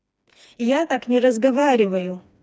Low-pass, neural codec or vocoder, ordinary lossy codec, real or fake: none; codec, 16 kHz, 2 kbps, FreqCodec, smaller model; none; fake